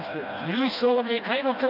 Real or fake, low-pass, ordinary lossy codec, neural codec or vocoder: fake; 5.4 kHz; none; codec, 16 kHz, 1 kbps, FreqCodec, smaller model